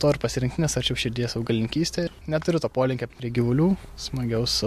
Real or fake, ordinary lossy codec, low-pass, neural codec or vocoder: real; MP3, 64 kbps; 14.4 kHz; none